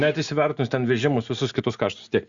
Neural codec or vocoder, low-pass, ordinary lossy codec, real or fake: none; 7.2 kHz; Opus, 64 kbps; real